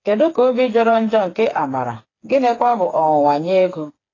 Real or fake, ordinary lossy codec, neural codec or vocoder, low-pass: fake; AAC, 32 kbps; codec, 16 kHz, 4 kbps, FreqCodec, smaller model; 7.2 kHz